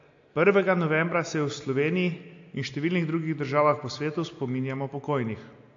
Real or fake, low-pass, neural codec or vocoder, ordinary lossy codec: real; 7.2 kHz; none; AAC, 48 kbps